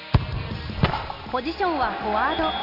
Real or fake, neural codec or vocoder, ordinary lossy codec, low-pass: real; none; none; 5.4 kHz